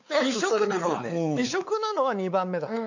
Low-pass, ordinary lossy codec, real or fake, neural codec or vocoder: 7.2 kHz; none; fake; codec, 16 kHz, 4 kbps, X-Codec, WavLM features, trained on Multilingual LibriSpeech